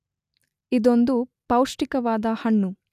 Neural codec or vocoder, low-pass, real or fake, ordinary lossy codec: none; 14.4 kHz; real; none